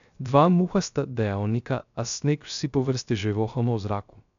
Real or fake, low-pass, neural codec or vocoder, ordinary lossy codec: fake; 7.2 kHz; codec, 16 kHz, 0.3 kbps, FocalCodec; none